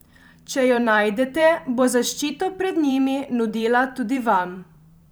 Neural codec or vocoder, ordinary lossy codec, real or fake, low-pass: vocoder, 44.1 kHz, 128 mel bands every 512 samples, BigVGAN v2; none; fake; none